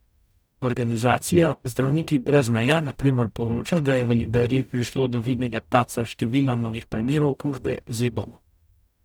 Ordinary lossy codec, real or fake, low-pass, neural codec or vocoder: none; fake; none; codec, 44.1 kHz, 0.9 kbps, DAC